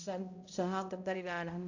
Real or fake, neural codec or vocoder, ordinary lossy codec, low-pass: fake; codec, 16 kHz, 0.5 kbps, X-Codec, HuBERT features, trained on balanced general audio; AAC, 48 kbps; 7.2 kHz